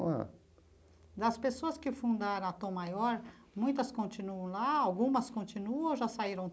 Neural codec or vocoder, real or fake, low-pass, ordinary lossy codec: none; real; none; none